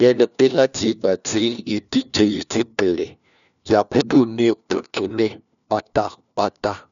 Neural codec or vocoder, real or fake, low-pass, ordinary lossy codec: codec, 16 kHz, 1 kbps, FunCodec, trained on LibriTTS, 50 frames a second; fake; 7.2 kHz; none